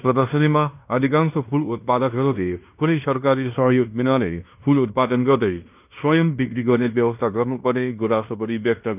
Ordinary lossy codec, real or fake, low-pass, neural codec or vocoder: none; fake; 3.6 kHz; codec, 16 kHz in and 24 kHz out, 0.9 kbps, LongCat-Audio-Codec, fine tuned four codebook decoder